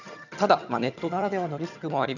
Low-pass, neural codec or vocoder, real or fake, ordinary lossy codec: 7.2 kHz; vocoder, 22.05 kHz, 80 mel bands, HiFi-GAN; fake; none